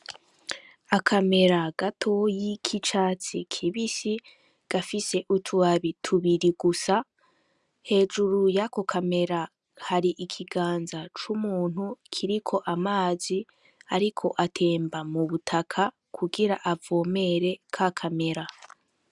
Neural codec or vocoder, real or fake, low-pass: none; real; 10.8 kHz